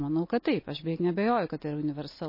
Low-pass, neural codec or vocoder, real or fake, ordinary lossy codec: 5.4 kHz; none; real; MP3, 24 kbps